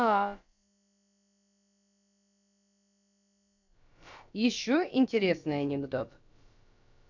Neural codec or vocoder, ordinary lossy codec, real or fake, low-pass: codec, 16 kHz, about 1 kbps, DyCAST, with the encoder's durations; none; fake; 7.2 kHz